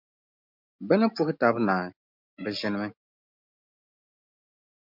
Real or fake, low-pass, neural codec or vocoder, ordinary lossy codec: real; 5.4 kHz; none; MP3, 48 kbps